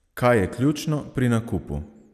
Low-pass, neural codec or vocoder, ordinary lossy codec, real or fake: 14.4 kHz; none; none; real